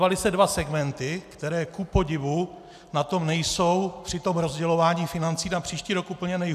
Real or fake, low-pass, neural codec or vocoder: real; 14.4 kHz; none